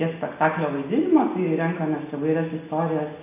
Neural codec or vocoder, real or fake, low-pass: none; real; 3.6 kHz